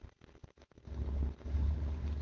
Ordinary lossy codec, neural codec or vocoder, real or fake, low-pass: Opus, 16 kbps; codec, 16 kHz, 4.8 kbps, FACodec; fake; 7.2 kHz